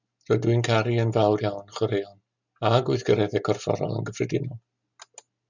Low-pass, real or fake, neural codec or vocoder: 7.2 kHz; real; none